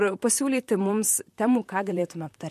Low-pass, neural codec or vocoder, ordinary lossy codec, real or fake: 14.4 kHz; vocoder, 44.1 kHz, 128 mel bands, Pupu-Vocoder; MP3, 64 kbps; fake